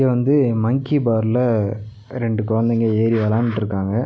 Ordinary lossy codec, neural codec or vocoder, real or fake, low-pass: none; none; real; none